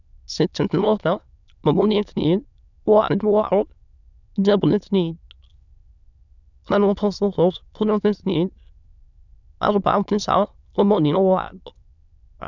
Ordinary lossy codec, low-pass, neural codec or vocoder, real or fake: none; 7.2 kHz; autoencoder, 22.05 kHz, a latent of 192 numbers a frame, VITS, trained on many speakers; fake